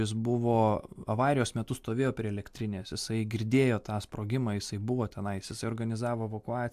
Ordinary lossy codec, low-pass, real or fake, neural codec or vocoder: AAC, 96 kbps; 14.4 kHz; real; none